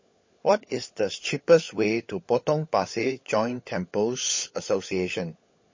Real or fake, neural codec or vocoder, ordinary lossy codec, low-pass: fake; codec, 16 kHz, 16 kbps, FunCodec, trained on LibriTTS, 50 frames a second; MP3, 32 kbps; 7.2 kHz